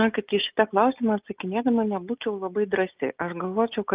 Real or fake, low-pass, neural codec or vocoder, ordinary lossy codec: real; 3.6 kHz; none; Opus, 24 kbps